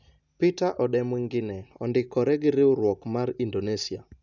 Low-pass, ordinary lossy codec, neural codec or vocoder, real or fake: 7.2 kHz; none; none; real